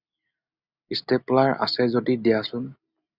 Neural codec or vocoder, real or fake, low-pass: none; real; 5.4 kHz